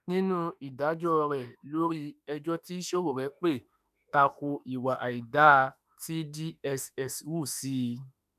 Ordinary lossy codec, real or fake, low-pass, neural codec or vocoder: none; fake; 14.4 kHz; autoencoder, 48 kHz, 32 numbers a frame, DAC-VAE, trained on Japanese speech